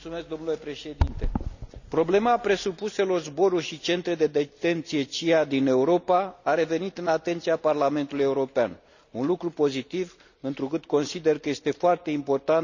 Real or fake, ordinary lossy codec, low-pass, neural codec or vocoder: real; none; 7.2 kHz; none